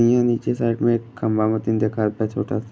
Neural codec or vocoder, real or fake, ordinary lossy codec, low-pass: none; real; none; none